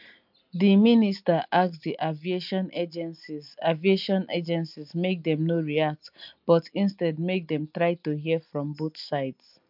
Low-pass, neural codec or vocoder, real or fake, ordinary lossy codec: 5.4 kHz; none; real; MP3, 48 kbps